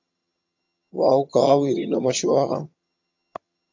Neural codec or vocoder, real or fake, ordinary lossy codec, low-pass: vocoder, 22.05 kHz, 80 mel bands, HiFi-GAN; fake; AAC, 48 kbps; 7.2 kHz